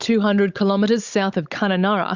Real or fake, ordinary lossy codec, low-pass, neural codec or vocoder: fake; Opus, 64 kbps; 7.2 kHz; codec, 16 kHz, 16 kbps, FunCodec, trained on Chinese and English, 50 frames a second